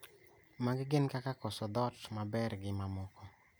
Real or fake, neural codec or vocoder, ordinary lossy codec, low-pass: real; none; none; none